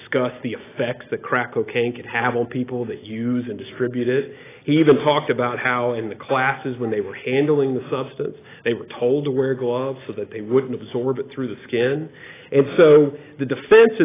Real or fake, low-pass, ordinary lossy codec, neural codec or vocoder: real; 3.6 kHz; AAC, 16 kbps; none